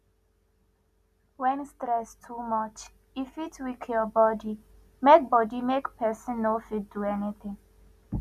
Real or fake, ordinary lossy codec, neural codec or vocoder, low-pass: real; none; none; 14.4 kHz